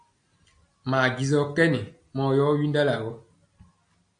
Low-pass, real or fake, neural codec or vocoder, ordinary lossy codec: 9.9 kHz; real; none; AAC, 64 kbps